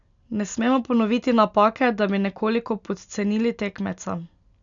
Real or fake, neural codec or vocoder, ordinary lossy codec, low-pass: real; none; none; 7.2 kHz